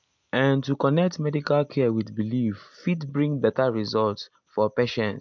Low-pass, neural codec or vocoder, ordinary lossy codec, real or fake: 7.2 kHz; none; MP3, 64 kbps; real